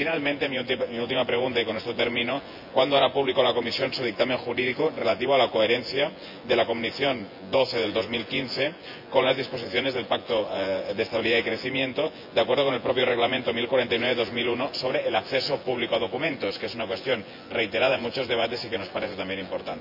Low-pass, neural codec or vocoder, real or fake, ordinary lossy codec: 5.4 kHz; vocoder, 24 kHz, 100 mel bands, Vocos; fake; MP3, 48 kbps